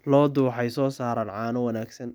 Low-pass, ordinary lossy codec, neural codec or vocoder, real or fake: none; none; none; real